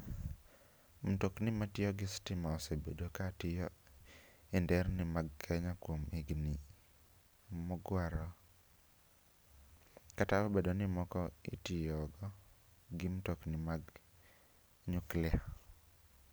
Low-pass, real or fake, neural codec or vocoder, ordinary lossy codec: none; real; none; none